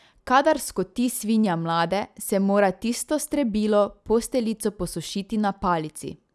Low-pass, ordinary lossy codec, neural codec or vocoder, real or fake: none; none; none; real